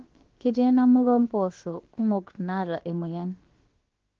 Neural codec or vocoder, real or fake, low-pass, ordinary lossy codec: codec, 16 kHz, about 1 kbps, DyCAST, with the encoder's durations; fake; 7.2 kHz; Opus, 16 kbps